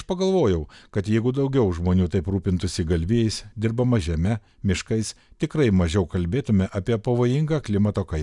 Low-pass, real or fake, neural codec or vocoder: 10.8 kHz; real; none